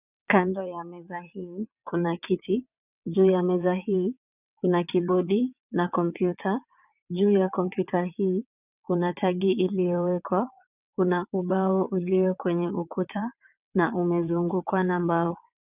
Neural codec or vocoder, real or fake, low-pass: vocoder, 22.05 kHz, 80 mel bands, WaveNeXt; fake; 3.6 kHz